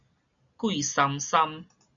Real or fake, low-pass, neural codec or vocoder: real; 7.2 kHz; none